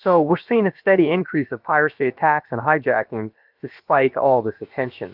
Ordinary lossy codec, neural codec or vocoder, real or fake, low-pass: Opus, 24 kbps; codec, 16 kHz, about 1 kbps, DyCAST, with the encoder's durations; fake; 5.4 kHz